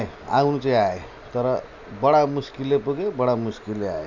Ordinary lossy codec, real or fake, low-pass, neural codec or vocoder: none; real; 7.2 kHz; none